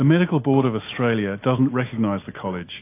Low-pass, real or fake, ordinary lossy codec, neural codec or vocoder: 3.6 kHz; real; AAC, 24 kbps; none